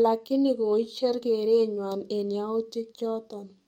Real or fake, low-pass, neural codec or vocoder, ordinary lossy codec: fake; 19.8 kHz; codec, 44.1 kHz, 7.8 kbps, DAC; MP3, 64 kbps